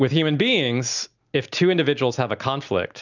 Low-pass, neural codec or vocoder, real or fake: 7.2 kHz; none; real